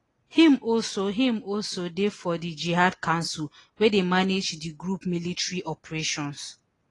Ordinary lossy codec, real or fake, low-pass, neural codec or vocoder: AAC, 32 kbps; real; 10.8 kHz; none